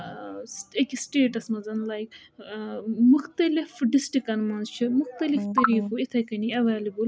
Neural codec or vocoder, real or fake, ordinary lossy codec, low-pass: none; real; none; none